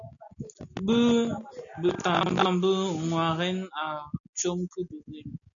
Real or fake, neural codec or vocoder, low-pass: real; none; 7.2 kHz